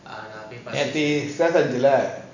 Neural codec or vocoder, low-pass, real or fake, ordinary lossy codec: none; 7.2 kHz; real; none